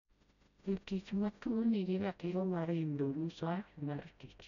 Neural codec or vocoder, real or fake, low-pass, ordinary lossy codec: codec, 16 kHz, 0.5 kbps, FreqCodec, smaller model; fake; 7.2 kHz; none